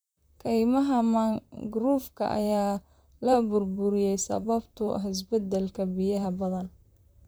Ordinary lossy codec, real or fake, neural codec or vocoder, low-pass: none; fake; vocoder, 44.1 kHz, 128 mel bands, Pupu-Vocoder; none